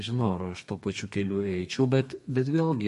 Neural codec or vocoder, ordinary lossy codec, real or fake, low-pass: codec, 32 kHz, 1.9 kbps, SNAC; MP3, 48 kbps; fake; 14.4 kHz